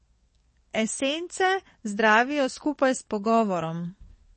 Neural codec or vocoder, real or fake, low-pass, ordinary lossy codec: codec, 44.1 kHz, 7.8 kbps, DAC; fake; 10.8 kHz; MP3, 32 kbps